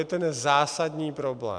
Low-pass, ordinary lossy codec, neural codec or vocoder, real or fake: 9.9 kHz; MP3, 96 kbps; none; real